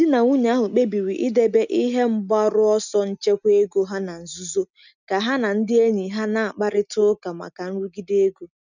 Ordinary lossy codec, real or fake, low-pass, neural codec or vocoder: none; real; 7.2 kHz; none